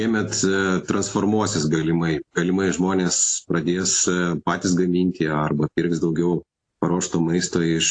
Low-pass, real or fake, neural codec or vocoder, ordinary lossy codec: 9.9 kHz; real; none; AAC, 48 kbps